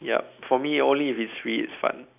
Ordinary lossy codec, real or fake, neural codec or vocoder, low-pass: none; real; none; 3.6 kHz